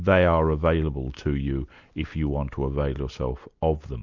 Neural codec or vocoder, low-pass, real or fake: none; 7.2 kHz; real